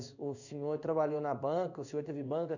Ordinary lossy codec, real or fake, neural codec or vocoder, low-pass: none; fake; codec, 16 kHz in and 24 kHz out, 1 kbps, XY-Tokenizer; 7.2 kHz